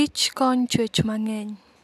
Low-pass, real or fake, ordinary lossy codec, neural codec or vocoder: 14.4 kHz; real; none; none